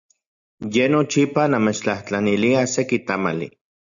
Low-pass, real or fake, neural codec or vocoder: 7.2 kHz; real; none